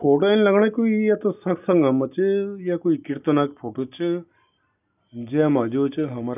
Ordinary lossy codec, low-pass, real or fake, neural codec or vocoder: none; 3.6 kHz; real; none